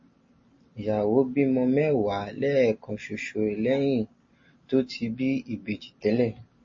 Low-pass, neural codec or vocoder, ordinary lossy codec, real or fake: 7.2 kHz; none; MP3, 32 kbps; real